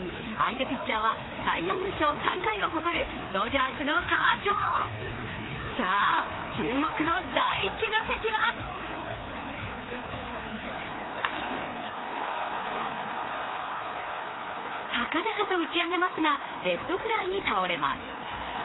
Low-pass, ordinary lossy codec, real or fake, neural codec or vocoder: 7.2 kHz; AAC, 16 kbps; fake; codec, 16 kHz, 2 kbps, FreqCodec, larger model